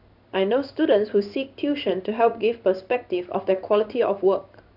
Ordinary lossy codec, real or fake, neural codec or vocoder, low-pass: AAC, 48 kbps; fake; codec, 16 kHz in and 24 kHz out, 1 kbps, XY-Tokenizer; 5.4 kHz